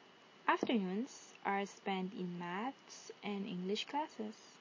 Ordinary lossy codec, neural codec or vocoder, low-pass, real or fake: MP3, 32 kbps; none; 7.2 kHz; real